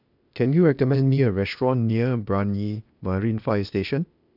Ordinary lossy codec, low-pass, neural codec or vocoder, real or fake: none; 5.4 kHz; codec, 16 kHz, 0.8 kbps, ZipCodec; fake